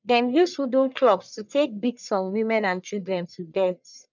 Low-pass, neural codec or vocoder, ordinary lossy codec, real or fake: 7.2 kHz; codec, 44.1 kHz, 1.7 kbps, Pupu-Codec; none; fake